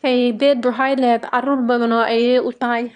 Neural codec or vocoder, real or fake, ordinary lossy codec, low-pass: autoencoder, 22.05 kHz, a latent of 192 numbers a frame, VITS, trained on one speaker; fake; none; 9.9 kHz